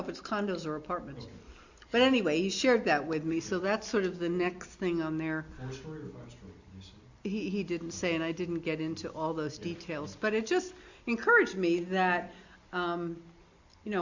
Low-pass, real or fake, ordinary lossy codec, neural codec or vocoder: 7.2 kHz; real; Opus, 64 kbps; none